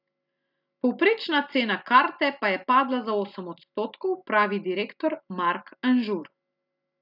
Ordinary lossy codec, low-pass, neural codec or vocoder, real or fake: none; 5.4 kHz; none; real